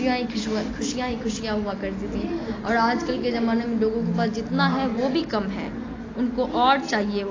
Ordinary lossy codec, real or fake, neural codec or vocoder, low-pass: AAC, 32 kbps; real; none; 7.2 kHz